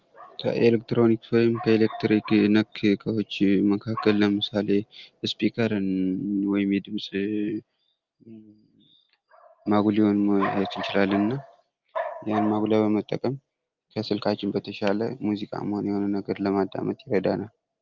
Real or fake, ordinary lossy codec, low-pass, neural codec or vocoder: real; Opus, 32 kbps; 7.2 kHz; none